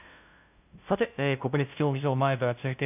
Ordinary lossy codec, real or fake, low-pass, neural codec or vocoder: none; fake; 3.6 kHz; codec, 16 kHz, 0.5 kbps, FunCodec, trained on LibriTTS, 25 frames a second